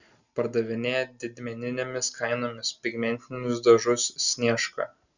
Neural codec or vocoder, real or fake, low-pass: none; real; 7.2 kHz